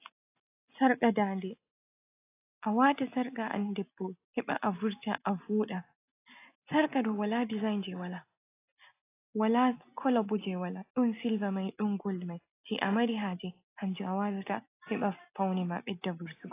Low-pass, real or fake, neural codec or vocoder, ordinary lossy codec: 3.6 kHz; real; none; AAC, 24 kbps